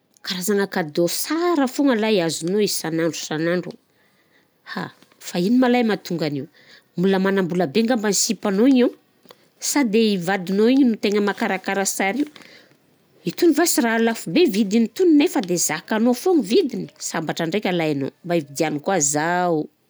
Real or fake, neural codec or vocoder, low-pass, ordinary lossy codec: real; none; none; none